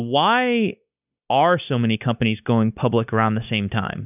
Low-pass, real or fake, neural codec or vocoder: 3.6 kHz; fake; codec, 16 kHz, 0.9 kbps, LongCat-Audio-Codec